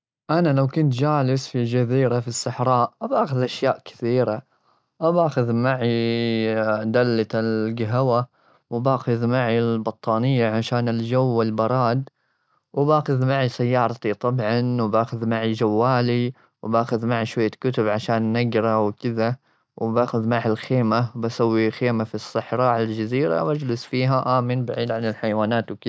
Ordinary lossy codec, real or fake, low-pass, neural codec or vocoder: none; real; none; none